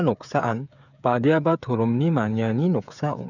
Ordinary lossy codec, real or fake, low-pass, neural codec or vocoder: none; fake; 7.2 kHz; codec, 16 kHz, 8 kbps, FreqCodec, smaller model